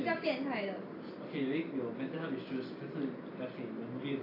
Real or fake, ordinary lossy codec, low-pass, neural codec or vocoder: real; AAC, 24 kbps; 5.4 kHz; none